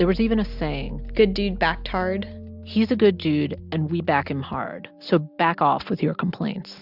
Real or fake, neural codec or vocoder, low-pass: real; none; 5.4 kHz